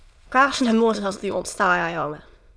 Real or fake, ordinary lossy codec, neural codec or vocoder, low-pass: fake; none; autoencoder, 22.05 kHz, a latent of 192 numbers a frame, VITS, trained on many speakers; none